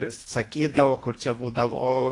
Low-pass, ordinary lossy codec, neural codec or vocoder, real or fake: 10.8 kHz; AAC, 48 kbps; codec, 24 kHz, 1.5 kbps, HILCodec; fake